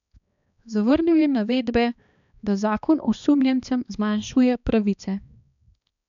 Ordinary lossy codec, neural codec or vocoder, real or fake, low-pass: none; codec, 16 kHz, 2 kbps, X-Codec, HuBERT features, trained on balanced general audio; fake; 7.2 kHz